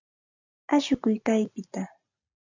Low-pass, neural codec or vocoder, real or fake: 7.2 kHz; none; real